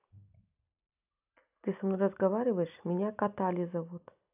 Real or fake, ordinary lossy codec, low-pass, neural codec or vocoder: real; none; 3.6 kHz; none